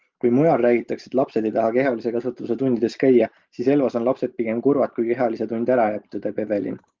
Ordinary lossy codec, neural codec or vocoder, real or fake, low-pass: Opus, 24 kbps; none; real; 7.2 kHz